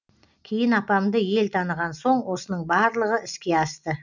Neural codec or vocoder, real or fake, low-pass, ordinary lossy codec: none; real; 7.2 kHz; none